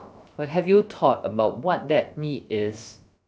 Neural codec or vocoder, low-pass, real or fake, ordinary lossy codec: codec, 16 kHz, about 1 kbps, DyCAST, with the encoder's durations; none; fake; none